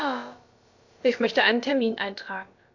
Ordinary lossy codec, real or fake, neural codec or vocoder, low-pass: AAC, 48 kbps; fake; codec, 16 kHz, about 1 kbps, DyCAST, with the encoder's durations; 7.2 kHz